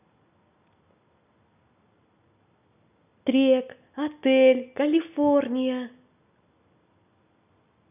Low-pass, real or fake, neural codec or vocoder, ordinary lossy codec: 3.6 kHz; real; none; none